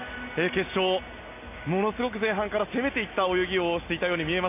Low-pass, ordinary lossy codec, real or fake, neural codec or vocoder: 3.6 kHz; AAC, 32 kbps; real; none